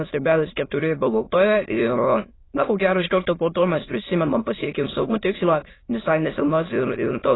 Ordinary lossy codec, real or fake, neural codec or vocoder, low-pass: AAC, 16 kbps; fake; autoencoder, 22.05 kHz, a latent of 192 numbers a frame, VITS, trained on many speakers; 7.2 kHz